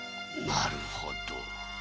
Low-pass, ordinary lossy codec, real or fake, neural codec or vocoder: none; none; real; none